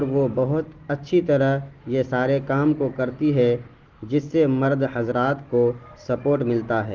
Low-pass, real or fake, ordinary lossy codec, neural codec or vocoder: 7.2 kHz; real; Opus, 16 kbps; none